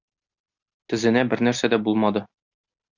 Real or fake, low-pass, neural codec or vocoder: real; 7.2 kHz; none